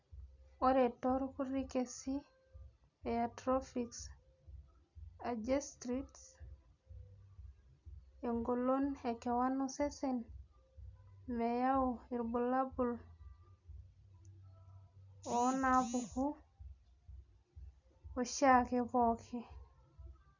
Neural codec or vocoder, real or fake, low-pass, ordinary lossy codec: none; real; 7.2 kHz; none